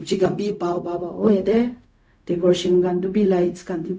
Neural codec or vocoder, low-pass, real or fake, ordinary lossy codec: codec, 16 kHz, 0.4 kbps, LongCat-Audio-Codec; none; fake; none